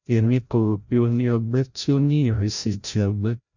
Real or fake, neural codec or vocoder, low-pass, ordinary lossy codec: fake; codec, 16 kHz, 0.5 kbps, FreqCodec, larger model; 7.2 kHz; none